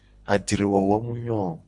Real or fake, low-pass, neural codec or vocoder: fake; 10.8 kHz; codec, 24 kHz, 3 kbps, HILCodec